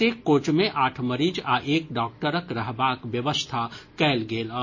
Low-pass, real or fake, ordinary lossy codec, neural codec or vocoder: 7.2 kHz; real; MP3, 32 kbps; none